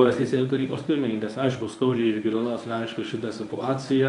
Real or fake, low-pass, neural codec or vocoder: fake; 10.8 kHz; codec, 24 kHz, 0.9 kbps, WavTokenizer, medium speech release version 1